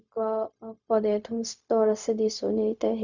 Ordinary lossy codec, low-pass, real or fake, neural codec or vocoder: none; 7.2 kHz; fake; codec, 16 kHz, 0.4 kbps, LongCat-Audio-Codec